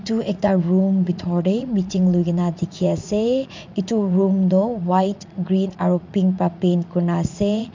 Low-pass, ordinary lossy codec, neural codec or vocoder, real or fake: 7.2 kHz; MP3, 64 kbps; vocoder, 44.1 kHz, 80 mel bands, Vocos; fake